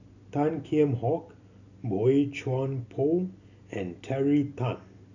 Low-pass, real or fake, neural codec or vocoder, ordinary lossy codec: 7.2 kHz; real; none; none